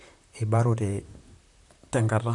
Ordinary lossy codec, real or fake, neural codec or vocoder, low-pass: none; fake; vocoder, 44.1 kHz, 128 mel bands, Pupu-Vocoder; 10.8 kHz